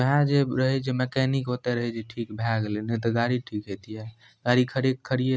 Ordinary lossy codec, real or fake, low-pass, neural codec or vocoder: none; real; none; none